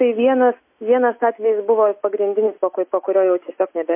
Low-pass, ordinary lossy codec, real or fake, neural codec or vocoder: 3.6 kHz; MP3, 24 kbps; real; none